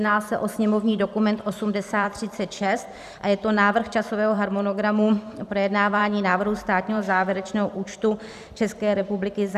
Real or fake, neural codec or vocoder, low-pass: fake; vocoder, 44.1 kHz, 128 mel bands every 512 samples, BigVGAN v2; 14.4 kHz